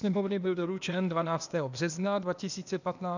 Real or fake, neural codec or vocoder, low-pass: fake; codec, 16 kHz, 0.8 kbps, ZipCodec; 7.2 kHz